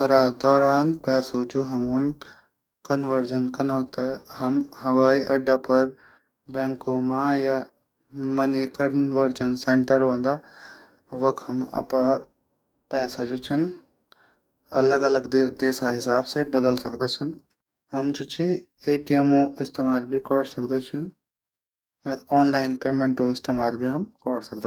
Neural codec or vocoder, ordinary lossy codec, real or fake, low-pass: codec, 44.1 kHz, 2.6 kbps, DAC; none; fake; 19.8 kHz